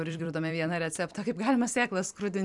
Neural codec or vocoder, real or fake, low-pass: vocoder, 44.1 kHz, 128 mel bands every 256 samples, BigVGAN v2; fake; 10.8 kHz